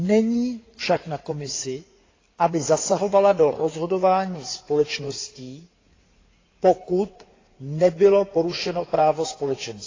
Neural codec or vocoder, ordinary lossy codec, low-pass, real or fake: codec, 16 kHz in and 24 kHz out, 2.2 kbps, FireRedTTS-2 codec; AAC, 32 kbps; 7.2 kHz; fake